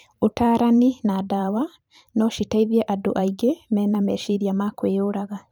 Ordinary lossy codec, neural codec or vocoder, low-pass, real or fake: none; vocoder, 44.1 kHz, 128 mel bands every 512 samples, BigVGAN v2; none; fake